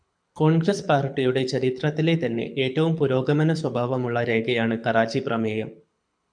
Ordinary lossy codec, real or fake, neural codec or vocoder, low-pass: MP3, 96 kbps; fake; codec, 24 kHz, 6 kbps, HILCodec; 9.9 kHz